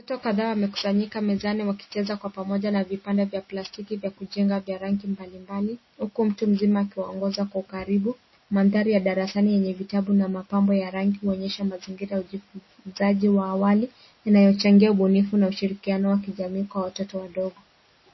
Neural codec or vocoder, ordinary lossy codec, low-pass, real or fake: none; MP3, 24 kbps; 7.2 kHz; real